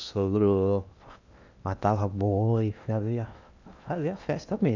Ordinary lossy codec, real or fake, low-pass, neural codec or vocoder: none; fake; 7.2 kHz; codec, 16 kHz in and 24 kHz out, 0.8 kbps, FocalCodec, streaming, 65536 codes